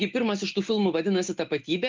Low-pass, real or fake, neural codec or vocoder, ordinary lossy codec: 7.2 kHz; real; none; Opus, 24 kbps